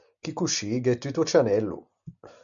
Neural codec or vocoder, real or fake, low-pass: none; real; 7.2 kHz